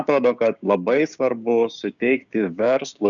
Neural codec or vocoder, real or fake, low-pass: codec, 16 kHz, 6 kbps, DAC; fake; 7.2 kHz